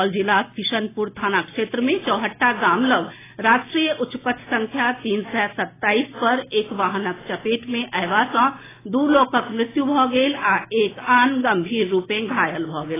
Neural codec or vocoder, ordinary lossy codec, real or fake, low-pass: none; AAC, 16 kbps; real; 3.6 kHz